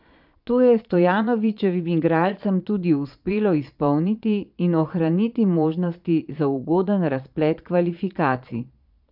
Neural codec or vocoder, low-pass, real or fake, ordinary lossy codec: vocoder, 22.05 kHz, 80 mel bands, Vocos; 5.4 kHz; fake; none